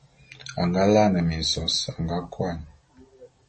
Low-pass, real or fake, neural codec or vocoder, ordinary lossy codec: 10.8 kHz; real; none; MP3, 32 kbps